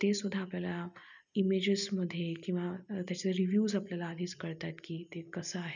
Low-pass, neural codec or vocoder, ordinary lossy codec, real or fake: 7.2 kHz; none; none; real